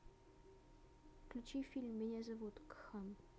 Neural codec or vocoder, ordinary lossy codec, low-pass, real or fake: none; none; none; real